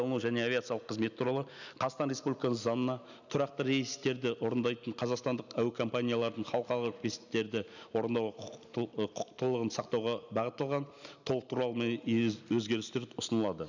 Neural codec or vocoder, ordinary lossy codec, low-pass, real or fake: none; none; 7.2 kHz; real